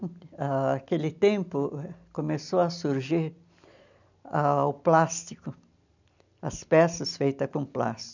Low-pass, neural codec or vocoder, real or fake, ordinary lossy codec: 7.2 kHz; none; real; none